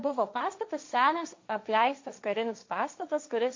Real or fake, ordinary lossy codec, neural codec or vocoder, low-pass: fake; MP3, 48 kbps; codec, 16 kHz, 1.1 kbps, Voila-Tokenizer; 7.2 kHz